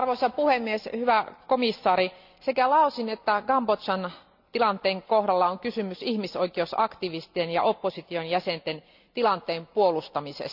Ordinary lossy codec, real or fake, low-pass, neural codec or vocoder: none; real; 5.4 kHz; none